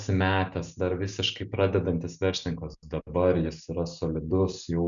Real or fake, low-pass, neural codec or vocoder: real; 7.2 kHz; none